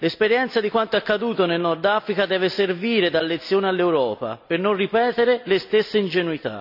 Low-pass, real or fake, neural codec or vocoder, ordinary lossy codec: 5.4 kHz; real; none; none